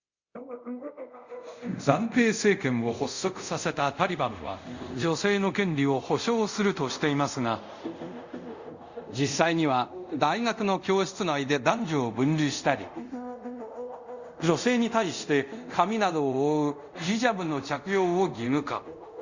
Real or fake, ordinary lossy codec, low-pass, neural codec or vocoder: fake; Opus, 64 kbps; 7.2 kHz; codec, 24 kHz, 0.5 kbps, DualCodec